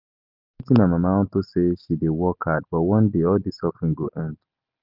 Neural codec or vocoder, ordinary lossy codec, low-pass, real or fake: none; Opus, 24 kbps; 5.4 kHz; real